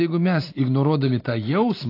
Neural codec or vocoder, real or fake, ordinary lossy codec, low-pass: none; real; AAC, 32 kbps; 5.4 kHz